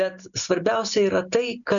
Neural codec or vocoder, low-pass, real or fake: none; 7.2 kHz; real